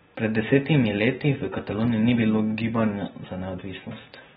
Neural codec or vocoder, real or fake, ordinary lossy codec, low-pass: none; real; AAC, 16 kbps; 19.8 kHz